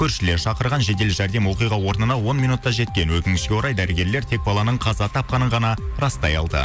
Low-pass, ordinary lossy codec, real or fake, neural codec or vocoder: none; none; real; none